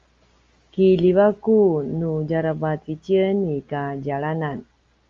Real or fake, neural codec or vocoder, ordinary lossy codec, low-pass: real; none; Opus, 32 kbps; 7.2 kHz